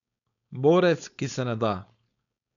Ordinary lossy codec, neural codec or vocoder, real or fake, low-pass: none; codec, 16 kHz, 4.8 kbps, FACodec; fake; 7.2 kHz